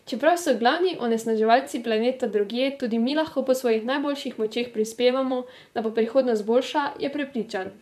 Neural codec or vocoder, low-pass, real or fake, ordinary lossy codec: vocoder, 44.1 kHz, 128 mel bands, Pupu-Vocoder; 14.4 kHz; fake; none